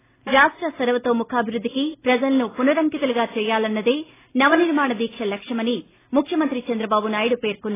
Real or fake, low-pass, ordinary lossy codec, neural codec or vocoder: real; 3.6 kHz; AAC, 16 kbps; none